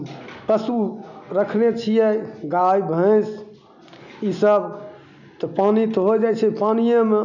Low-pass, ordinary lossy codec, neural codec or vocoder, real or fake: 7.2 kHz; none; none; real